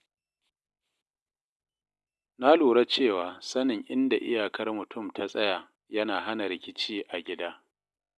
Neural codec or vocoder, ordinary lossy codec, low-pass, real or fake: none; none; none; real